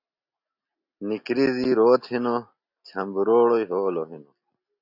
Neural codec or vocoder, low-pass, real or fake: none; 5.4 kHz; real